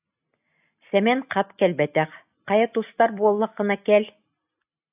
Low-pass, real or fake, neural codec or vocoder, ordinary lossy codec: 3.6 kHz; real; none; AAC, 32 kbps